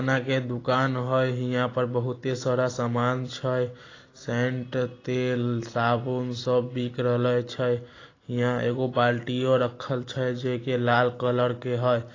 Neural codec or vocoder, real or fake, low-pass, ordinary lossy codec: none; real; 7.2 kHz; AAC, 32 kbps